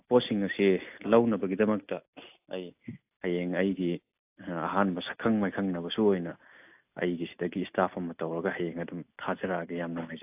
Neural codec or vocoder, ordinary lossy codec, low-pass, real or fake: none; AAC, 32 kbps; 3.6 kHz; real